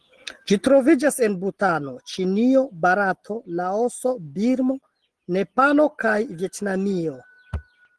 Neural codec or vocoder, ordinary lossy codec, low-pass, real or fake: none; Opus, 16 kbps; 10.8 kHz; real